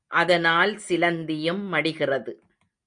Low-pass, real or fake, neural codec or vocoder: 9.9 kHz; real; none